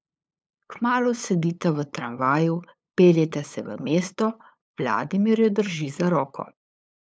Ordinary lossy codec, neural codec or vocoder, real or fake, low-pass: none; codec, 16 kHz, 8 kbps, FunCodec, trained on LibriTTS, 25 frames a second; fake; none